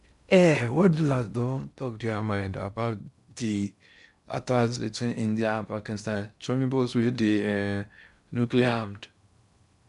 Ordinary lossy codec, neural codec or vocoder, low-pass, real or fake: none; codec, 16 kHz in and 24 kHz out, 0.6 kbps, FocalCodec, streaming, 2048 codes; 10.8 kHz; fake